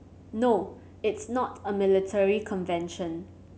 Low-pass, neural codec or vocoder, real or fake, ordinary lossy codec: none; none; real; none